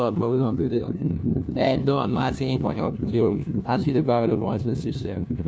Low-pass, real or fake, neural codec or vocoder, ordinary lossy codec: none; fake; codec, 16 kHz, 1 kbps, FunCodec, trained on LibriTTS, 50 frames a second; none